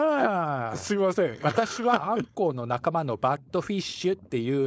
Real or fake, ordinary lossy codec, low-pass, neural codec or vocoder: fake; none; none; codec, 16 kHz, 4.8 kbps, FACodec